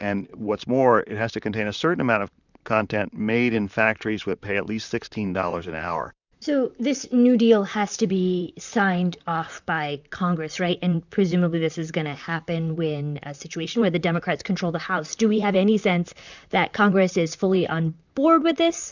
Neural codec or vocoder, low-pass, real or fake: vocoder, 44.1 kHz, 128 mel bands, Pupu-Vocoder; 7.2 kHz; fake